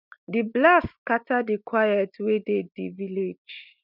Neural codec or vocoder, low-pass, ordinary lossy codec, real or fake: none; 5.4 kHz; none; real